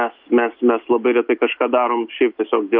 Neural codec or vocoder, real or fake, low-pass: none; real; 5.4 kHz